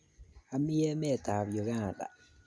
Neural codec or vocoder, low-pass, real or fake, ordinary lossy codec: none; none; real; none